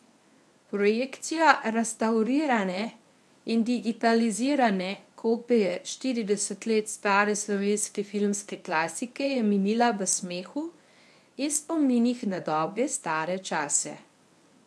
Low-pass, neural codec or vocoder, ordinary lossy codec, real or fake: none; codec, 24 kHz, 0.9 kbps, WavTokenizer, medium speech release version 1; none; fake